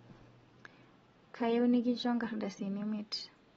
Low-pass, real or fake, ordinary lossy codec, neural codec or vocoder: 19.8 kHz; real; AAC, 24 kbps; none